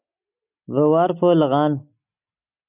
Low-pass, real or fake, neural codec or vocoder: 3.6 kHz; real; none